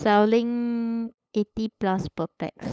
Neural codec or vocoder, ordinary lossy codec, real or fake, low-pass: codec, 16 kHz, 8 kbps, FunCodec, trained on LibriTTS, 25 frames a second; none; fake; none